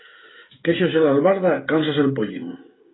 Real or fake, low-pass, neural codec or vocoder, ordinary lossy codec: fake; 7.2 kHz; codec, 16 kHz, 16 kbps, FreqCodec, smaller model; AAC, 16 kbps